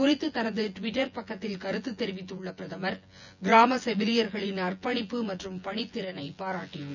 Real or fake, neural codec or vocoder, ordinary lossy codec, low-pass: fake; vocoder, 24 kHz, 100 mel bands, Vocos; none; 7.2 kHz